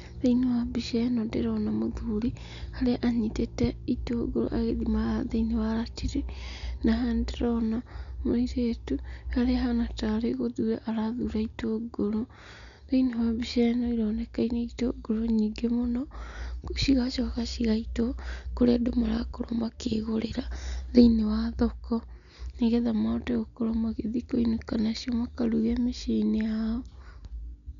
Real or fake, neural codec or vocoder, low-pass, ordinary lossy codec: real; none; 7.2 kHz; none